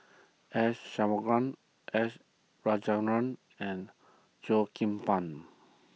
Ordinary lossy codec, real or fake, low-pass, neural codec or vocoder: none; real; none; none